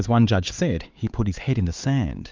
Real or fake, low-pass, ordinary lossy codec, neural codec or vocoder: fake; 7.2 kHz; Opus, 32 kbps; codec, 16 kHz, 4 kbps, X-Codec, HuBERT features, trained on LibriSpeech